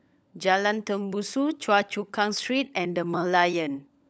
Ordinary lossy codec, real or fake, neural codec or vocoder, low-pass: none; fake; codec, 16 kHz, 16 kbps, FunCodec, trained on LibriTTS, 50 frames a second; none